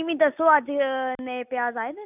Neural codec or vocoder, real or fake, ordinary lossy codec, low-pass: none; real; none; 3.6 kHz